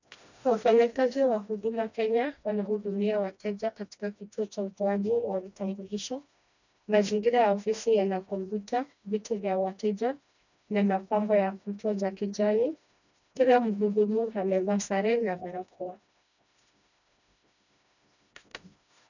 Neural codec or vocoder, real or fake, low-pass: codec, 16 kHz, 1 kbps, FreqCodec, smaller model; fake; 7.2 kHz